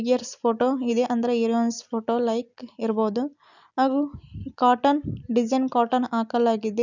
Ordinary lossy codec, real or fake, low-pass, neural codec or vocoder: none; real; 7.2 kHz; none